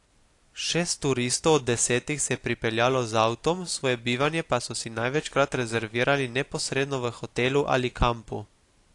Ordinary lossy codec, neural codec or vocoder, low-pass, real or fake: AAC, 48 kbps; none; 10.8 kHz; real